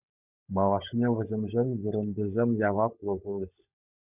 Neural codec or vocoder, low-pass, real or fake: codec, 16 kHz, 16 kbps, FunCodec, trained on LibriTTS, 50 frames a second; 3.6 kHz; fake